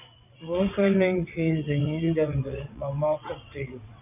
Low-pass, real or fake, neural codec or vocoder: 3.6 kHz; fake; vocoder, 22.05 kHz, 80 mel bands, WaveNeXt